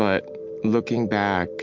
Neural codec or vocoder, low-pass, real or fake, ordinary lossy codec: none; 7.2 kHz; real; MP3, 64 kbps